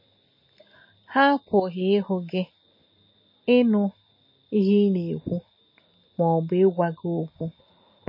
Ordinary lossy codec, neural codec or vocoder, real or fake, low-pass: MP3, 24 kbps; none; real; 5.4 kHz